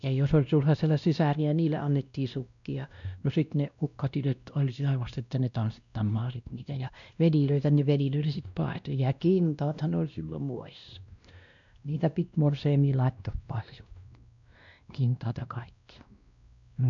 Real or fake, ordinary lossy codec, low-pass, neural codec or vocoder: fake; none; 7.2 kHz; codec, 16 kHz, 1 kbps, X-Codec, WavLM features, trained on Multilingual LibriSpeech